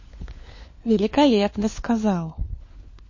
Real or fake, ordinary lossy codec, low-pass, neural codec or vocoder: fake; MP3, 32 kbps; 7.2 kHz; codec, 16 kHz, 4 kbps, FunCodec, trained on LibriTTS, 50 frames a second